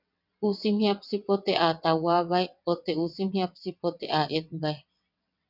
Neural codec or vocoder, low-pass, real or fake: vocoder, 22.05 kHz, 80 mel bands, WaveNeXt; 5.4 kHz; fake